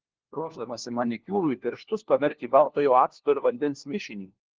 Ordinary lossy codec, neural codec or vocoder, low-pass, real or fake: Opus, 16 kbps; codec, 16 kHz, 1 kbps, FunCodec, trained on LibriTTS, 50 frames a second; 7.2 kHz; fake